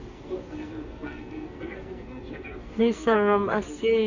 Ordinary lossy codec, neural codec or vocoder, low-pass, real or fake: Opus, 64 kbps; codec, 44.1 kHz, 2.6 kbps, SNAC; 7.2 kHz; fake